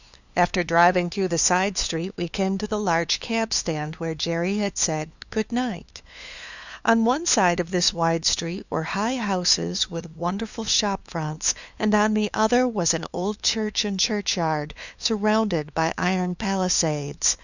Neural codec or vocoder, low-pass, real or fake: codec, 16 kHz, 2 kbps, FunCodec, trained on LibriTTS, 25 frames a second; 7.2 kHz; fake